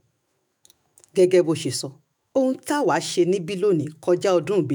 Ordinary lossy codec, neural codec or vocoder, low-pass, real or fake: none; autoencoder, 48 kHz, 128 numbers a frame, DAC-VAE, trained on Japanese speech; none; fake